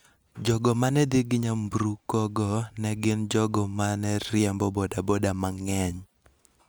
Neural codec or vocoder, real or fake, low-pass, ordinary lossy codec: none; real; none; none